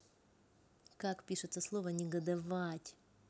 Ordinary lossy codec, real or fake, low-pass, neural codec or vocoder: none; real; none; none